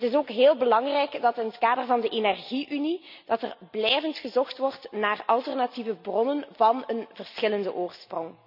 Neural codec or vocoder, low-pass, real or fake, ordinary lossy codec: none; 5.4 kHz; real; none